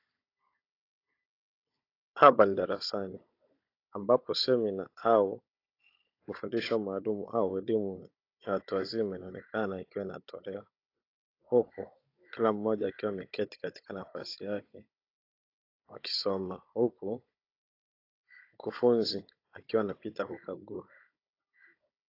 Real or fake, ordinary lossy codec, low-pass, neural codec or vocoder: fake; AAC, 32 kbps; 5.4 kHz; codec, 16 kHz, 16 kbps, FunCodec, trained on Chinese and English, 50 frames a second